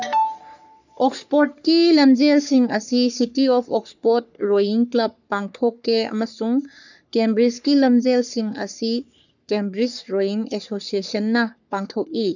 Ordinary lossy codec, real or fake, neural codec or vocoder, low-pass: none; fake; codec, 44.1 kHz, 3.4 kbps, Pupu-Codec; 7.2 kHz